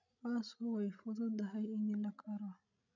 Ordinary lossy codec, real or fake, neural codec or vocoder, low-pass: none; fake; codec, 16 kHz, 16 kbps, FreqCodec, larger model; 7.2 kHz